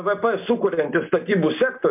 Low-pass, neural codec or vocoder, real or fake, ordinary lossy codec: 3.6 kHz; none; real; MP3, 32 kbps